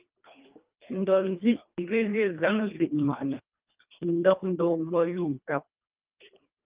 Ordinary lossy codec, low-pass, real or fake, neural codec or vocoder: Opus, 24 kbps; 3.6 kHz; fake; codec, 24 kHz, 1.5 kbps, HILCodec